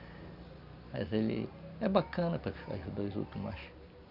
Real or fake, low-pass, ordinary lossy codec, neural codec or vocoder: real; 5.4 kHz; none; none